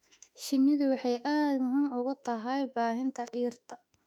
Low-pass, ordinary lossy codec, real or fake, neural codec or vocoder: 19.8 kHz; none; fake; autoencoder, 48 kHz, 32 numbers a frame, DAC-VAE, trained on Japanese speech